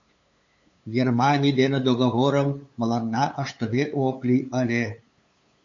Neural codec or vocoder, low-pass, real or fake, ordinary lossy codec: codec, 16 kHz, 8 kbps, FunCodec, trained on LibriTTS, 25 frames a second; 7.2 kHz; fake; AAC, 48 kbps